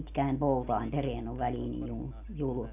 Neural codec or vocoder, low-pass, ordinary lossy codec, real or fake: none; 3.6 kHz; none; real